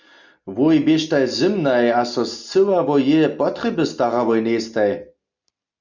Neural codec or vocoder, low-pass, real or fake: none; 7.2 kHz; real